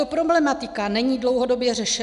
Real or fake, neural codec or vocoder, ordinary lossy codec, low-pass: real; none; MP3, 96 kbps; 10.8 kHz